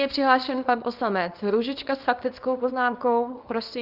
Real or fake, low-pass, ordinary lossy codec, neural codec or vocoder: fake; 5.4 kHz; Opus, 24 kbps; codec, 24 kHz, 0.9 kbps, WavTokenizer, small release